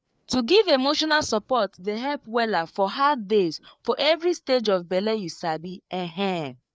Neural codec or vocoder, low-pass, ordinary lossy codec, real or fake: codec, 16 kHz, 4 kbps, FreqCodec, larger model; none; none; fake